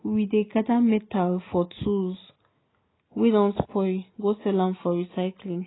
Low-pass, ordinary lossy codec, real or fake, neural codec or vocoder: 7.2 kHz; AAC, 16 kbps; real; none